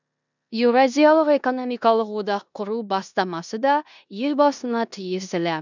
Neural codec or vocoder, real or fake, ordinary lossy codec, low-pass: codec, 16 kHz in and 24 kHz out, 0.9 kbps, LongCat-Audio-Codec, four codebook decoder; fake; none; 7.2 kHz